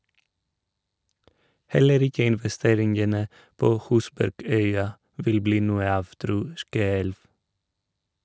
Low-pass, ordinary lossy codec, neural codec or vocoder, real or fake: none; none; none; real